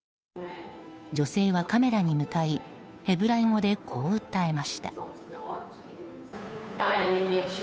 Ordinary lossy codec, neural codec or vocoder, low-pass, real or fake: none; codec, 16 kHz, 2 kbps, FunCodec, trained on Chinese and English, 25 frames a second; none; fake